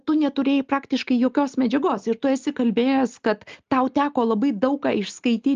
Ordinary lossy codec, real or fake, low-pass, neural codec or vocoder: Opus, 32 kbps; real; 7.2 kHz; none